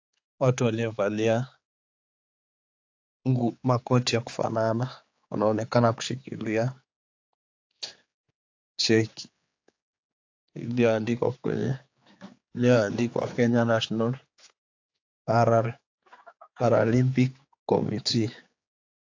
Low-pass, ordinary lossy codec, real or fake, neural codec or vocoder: 7.2 kHz; AAC, 48 kbps; fake; codec, 16 kHz, 4 kbps, X-Codec, HuBERT features, trained on general audio